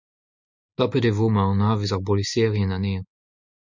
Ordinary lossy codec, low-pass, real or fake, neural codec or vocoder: MP3, 64 kbps; 7.2 kHz; real; none